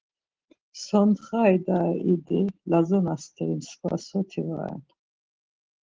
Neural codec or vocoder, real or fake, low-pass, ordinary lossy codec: none; real; 7.2 kHz; Opus, 16 kbps